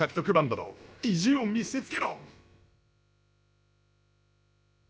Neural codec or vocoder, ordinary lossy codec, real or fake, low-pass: codec, 16 kHz, about 1 kbps, DyCAST, with the encoder's durations; none; fake; none